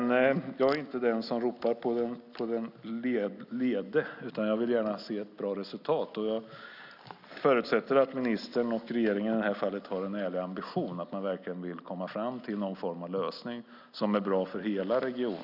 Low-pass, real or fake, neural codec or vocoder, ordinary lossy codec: 5.4 kHz; real; none; none